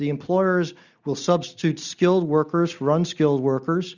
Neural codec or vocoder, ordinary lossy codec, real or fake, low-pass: none; Opus, 64 kbps; real; 7.2 kHz